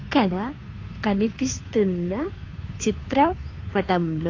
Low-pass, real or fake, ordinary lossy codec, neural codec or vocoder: 7.2 kHz; fake; AAC, 32 kbps; codec, 16 kHz, 2 kbps, FunCodec, trained on Chinese and English, 25 frames a second